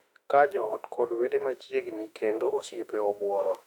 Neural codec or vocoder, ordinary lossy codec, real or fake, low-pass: autoencoder, 48 kHz, 32 numbers a frame, DAC-VAE, trained on Japanese speech; none; fake; 19.8 kHz